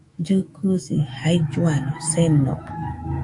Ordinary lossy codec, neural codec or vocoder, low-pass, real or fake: MP3, 64 kbps; autoencoder, 48 kHz, 128 numbers a frame, DAC-VAE, trained on Japanese speech; 10.8 kHz; fake